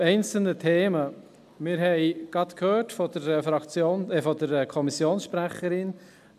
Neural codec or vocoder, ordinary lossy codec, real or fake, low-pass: none; none; real; 14.4 kHz